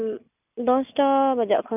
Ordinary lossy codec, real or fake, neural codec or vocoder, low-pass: none; real; none; 3.6 kHz